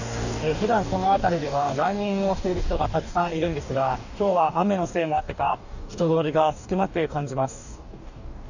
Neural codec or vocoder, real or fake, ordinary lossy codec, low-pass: codec, 44.1 kHz, 2.6 kbps, DAC; fake; none; 7.2 kHz